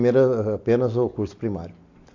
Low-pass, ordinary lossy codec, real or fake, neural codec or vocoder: 7.2 kHz; AAC, 48 kbps; real; none